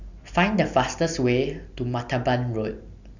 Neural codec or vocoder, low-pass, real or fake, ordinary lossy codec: none; 7.2 kHz; real; none